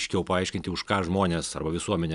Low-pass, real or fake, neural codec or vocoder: 10.8 kHz; real; none